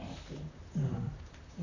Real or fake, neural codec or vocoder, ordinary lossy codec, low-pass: fake; vocoder, 44.1 kHz, 128 mel bands, Pupu-Vocoder; none; 7.2 kHz